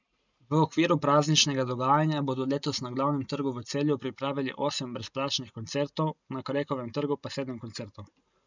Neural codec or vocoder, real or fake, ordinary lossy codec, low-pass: none; real; none; 7.2 kHz